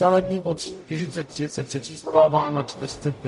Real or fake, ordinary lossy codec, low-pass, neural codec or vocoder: fake; MP3, 48 kbps; 14.4 kHz; codec, 44.1 kHz, 0.9 kbps, DAC